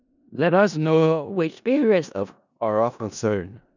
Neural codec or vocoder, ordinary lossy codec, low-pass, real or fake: codec, 16 kHz in and 24 kHz out, 0.4 kbps, LongCat-Audio-Codec, four codebook decoder; none; 7.2 kHz; fake